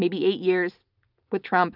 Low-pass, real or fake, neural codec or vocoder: 5.4 kHz; real; none